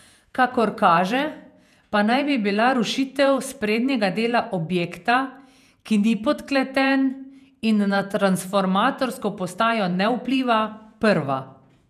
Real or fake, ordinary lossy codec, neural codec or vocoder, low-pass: fake; none; vocoder, 48 kHz, 128 mel bands, Vocos; 14.4 kHz